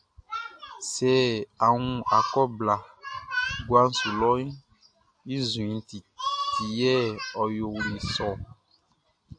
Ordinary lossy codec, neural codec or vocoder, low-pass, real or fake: AAC, 64 kbps; none; 9.9 kHz; real